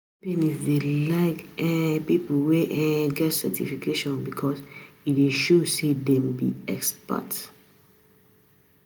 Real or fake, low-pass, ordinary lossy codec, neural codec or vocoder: real; none; none; none